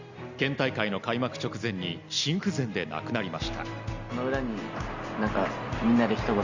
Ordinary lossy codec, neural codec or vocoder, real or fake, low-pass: Opus, 64 kbps; none; real; 7.2 kHz